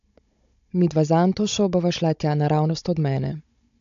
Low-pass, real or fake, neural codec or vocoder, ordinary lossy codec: 7.2 kHz; fake; codec, 16 kHz, 16 kbps, FunCodec, trained on Chinese and English, 50 frames a second; AAC, 64 kbps